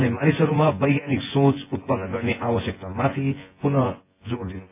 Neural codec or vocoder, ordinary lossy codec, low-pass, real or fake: vocoder, 24 kHz, 100 mel bands, Vocos; AAC, 24 kbps; 3.6 kHz; fake